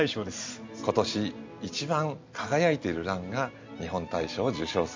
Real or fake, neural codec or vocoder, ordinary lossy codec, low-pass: real; none; MP3, 64 kbps; 7.2 kHz